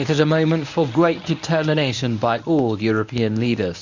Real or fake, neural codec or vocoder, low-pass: fake; codec, 24 kHz, 0.9 kbps, WavTokenizer, medium speech release version 1; 7.2 kHz